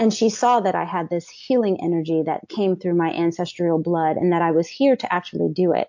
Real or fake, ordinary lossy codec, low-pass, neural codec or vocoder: real; MP3, 48 kbps; 7.2 kHz; none